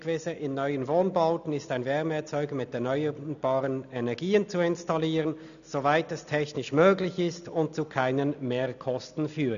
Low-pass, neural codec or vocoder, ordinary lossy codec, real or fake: 7.2 kHz; none; none; real